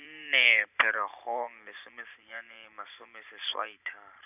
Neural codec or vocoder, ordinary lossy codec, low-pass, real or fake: vocoder, 44.1 kHz, 128 mel bands every 512 samples, BigVGAN v2; none; 3.6 kHz; fake